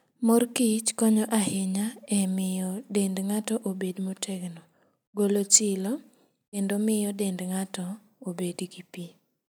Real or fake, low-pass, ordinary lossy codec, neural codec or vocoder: real; none; none; none